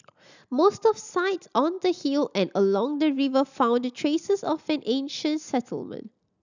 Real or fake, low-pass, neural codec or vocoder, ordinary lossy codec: real; 7.2 kHz; none; none